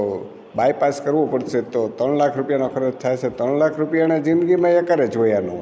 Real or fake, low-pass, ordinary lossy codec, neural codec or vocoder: real; none; none; none